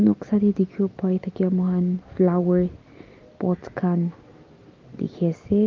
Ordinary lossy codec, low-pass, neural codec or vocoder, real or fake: Opus, 32 kbps; 7.2 kHz; none; real